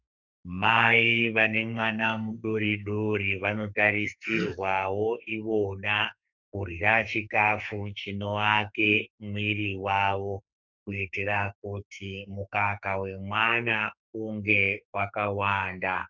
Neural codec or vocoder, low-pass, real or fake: codec, 44.1 kHz, 2.6 kbps, SNAC; 7.2 kHz; fake